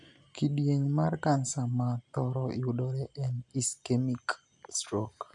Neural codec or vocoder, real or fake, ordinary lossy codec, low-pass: none; real; none; 10.8 kHz